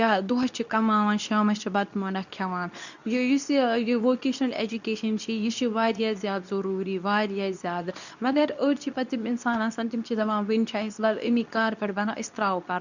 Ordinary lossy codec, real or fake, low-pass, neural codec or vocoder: none; fake; 7.2 kHz; codec, 24 kHz, 0.9 kbps, WavTokenizer, medium speech release version 2